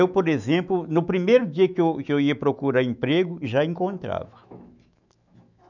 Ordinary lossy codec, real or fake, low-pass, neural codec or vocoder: none; fake; 7.2 kHz; autoencoder, 48 kHz, 128 numbers a frame, DAC-VAE, trained on Japanese speech